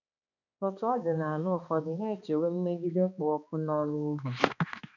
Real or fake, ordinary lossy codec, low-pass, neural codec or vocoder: fake; none; 7.2 kHz; codec, 16 kHz, 2 kbps, X-Codec, HuBERT features, trained on balanced general audio